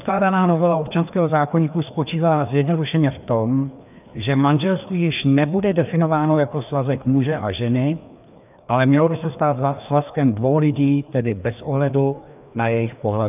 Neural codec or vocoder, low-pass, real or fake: codec, 16 kHz, 2 kbps, FreqCodec, larger model; 3.6 kHz; fake